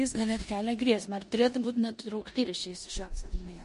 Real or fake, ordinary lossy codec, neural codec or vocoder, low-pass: fake; MP3, 48 kbps; codec, 16 kHz in and 24 kHz out, 0.9 kbps, LongCat-Audio-Codec, four codebook decoder; 10.8 kHz